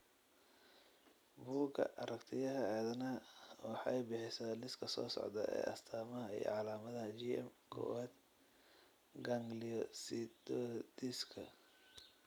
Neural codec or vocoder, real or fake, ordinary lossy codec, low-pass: vocoder, 44.1 kHz, 128 mel bands every 256 samples, BigVGAN v2; fake; none; none